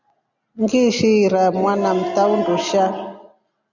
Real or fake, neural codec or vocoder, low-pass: real; none; 7.2 kHz